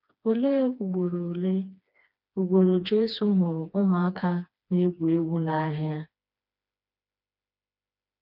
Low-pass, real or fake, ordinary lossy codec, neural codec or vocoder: 5.4 kHz; fake; none; codec, 16 kHz, 2 kbps, FreqCodec, smaller model